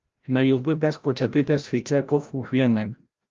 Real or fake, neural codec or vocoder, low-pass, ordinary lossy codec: fake; codec, 16 kHz, 0.5 kbps, FreqCodec, larger model; 7.2 kHz; Opus, 32 kbps